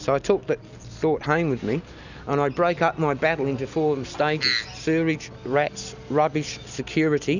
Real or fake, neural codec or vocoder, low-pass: real; none; 7.2 kHz